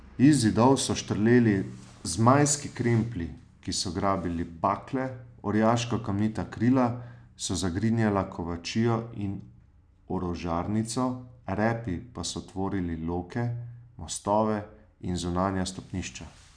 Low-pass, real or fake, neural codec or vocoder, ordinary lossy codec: 9.9 kHz; real; none; none